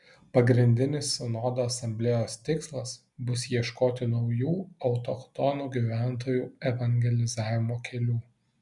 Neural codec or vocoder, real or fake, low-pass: none; real; 10.8 kHz